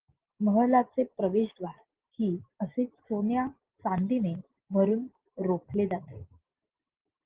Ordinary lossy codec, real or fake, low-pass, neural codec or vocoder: Opus, 16 kbps; real; 3.6 kHz; none